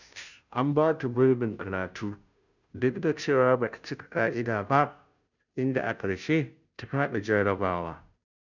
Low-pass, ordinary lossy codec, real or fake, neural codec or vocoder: 7.2 kHz; none; fake; codec, 16 kHz, 0.5 kbps, FunCodec, trained on Chinese and English, 25 frames a second